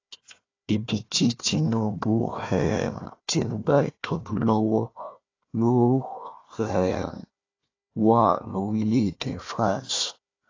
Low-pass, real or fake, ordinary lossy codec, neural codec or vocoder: 7.2 kHz; fake; AAC, 32 kbps; codec, 16 kHz, 1 kbps, FunCodec, trained on Chinese and English, 50 frames a second